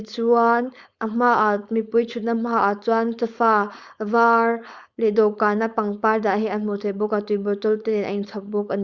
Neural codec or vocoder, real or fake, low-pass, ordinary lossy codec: codec, 16 kHz, 4.8 kbps, FACodec; fake; 7.2 kHz; Opus, 64 kbps